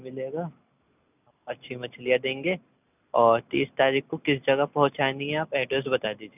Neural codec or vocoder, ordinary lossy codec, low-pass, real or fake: none; none; 3.6 kHz; real